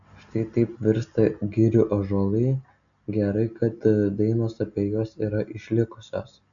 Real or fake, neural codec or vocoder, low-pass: real; none; 7.2 kHz